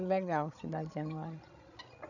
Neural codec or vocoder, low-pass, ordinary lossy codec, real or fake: codec, 16 kHz, 16 kbps, FreqCodec, larger model; 7.2 kHz; none; fake